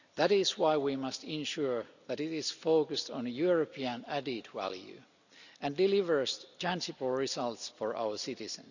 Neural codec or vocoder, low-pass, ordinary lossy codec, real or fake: none; 7.2 kHz; none; real